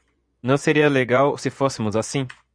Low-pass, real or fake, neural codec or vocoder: 9.9 kHz; fake; vocoder, 24 kHz, 100 mel bands, Vocos